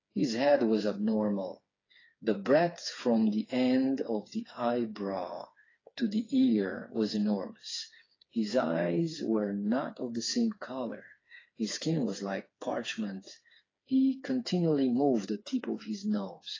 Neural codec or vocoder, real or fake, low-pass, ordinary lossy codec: codec, 16 kHz, 4 kbps, FreqCodec, smaller model; fake; 7.2 kHz; AAC, 32 kbps